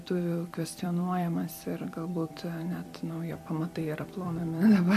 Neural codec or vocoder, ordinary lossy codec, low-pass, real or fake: vocoder, 44.1 kHz, 128 mel bands every 256 samples, BigVGAN v2; MP3, 64 kbps; 14.4 kHz; fake